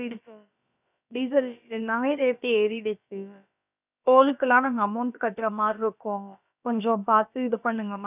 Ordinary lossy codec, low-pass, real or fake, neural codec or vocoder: none; 3.6 kHz; fake; codec, 16 kHz, about 1 kbps, DyCAST, with the encoder's durations